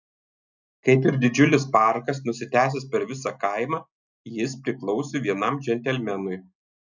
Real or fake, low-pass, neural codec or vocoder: real; 7.2 kHz; none